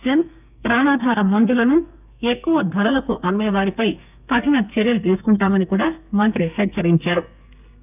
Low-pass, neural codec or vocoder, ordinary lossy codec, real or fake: 3.6 kHz; codec, 32 kHz, 1.9 kbps, SNAC; none; fake